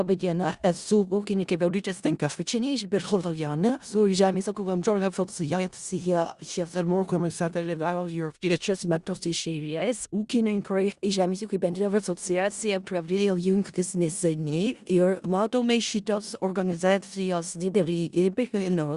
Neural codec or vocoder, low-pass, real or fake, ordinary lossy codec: codec, 16 kHz in and 24 kHz out, 0.4 kbps, LongCat-Audio-Codec, four codebook decoder; 10.8 kHz; fake; Opus, 64 kbps